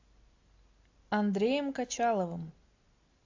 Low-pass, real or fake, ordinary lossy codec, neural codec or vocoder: 7.2 kHz; real; AAC, 48 kbps; none